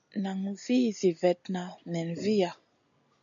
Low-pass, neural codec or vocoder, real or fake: 7.2 kHz; none; real